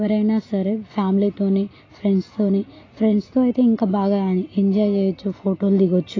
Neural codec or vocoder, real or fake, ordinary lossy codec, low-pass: none; real; AAC, 32 kbps; 7.2 kHz